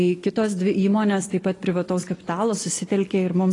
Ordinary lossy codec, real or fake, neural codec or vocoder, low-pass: AAC, 32 kbps; real; none; 10.8 kHz